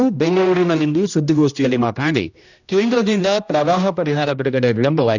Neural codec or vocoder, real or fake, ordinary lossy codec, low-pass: codec, 16 kHz, 1 kbps, X-Codec, HuBERT features, trained on general audio; fake; none; 7.2 kHz